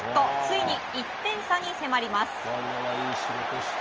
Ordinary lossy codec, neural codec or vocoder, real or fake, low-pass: Opus, 24 kbps; none; real; 7.2 kHz